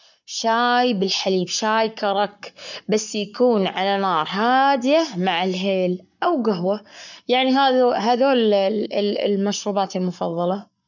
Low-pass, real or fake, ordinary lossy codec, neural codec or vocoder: 7.2 kHz; fake; none; codec, 44.1 kHz, 7.8 kbps, Pupu-Codec